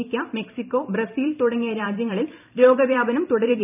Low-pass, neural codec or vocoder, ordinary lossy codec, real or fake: 3.6 kHz; vocoder, 44.1 kHz, 128 mel bands every 256 samples, BigVGAN v2; none; fake